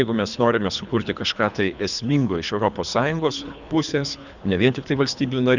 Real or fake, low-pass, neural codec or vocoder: fake; 7.2 kHz; codec, 24 kHz, 3 kbps, HILCodec